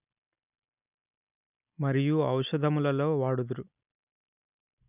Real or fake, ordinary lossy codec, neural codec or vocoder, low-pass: real; none; none; 3.6 kHz